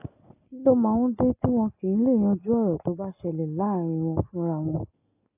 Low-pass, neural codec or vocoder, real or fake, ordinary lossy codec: 3.6 kHz; none; real; AAC, 32 kbps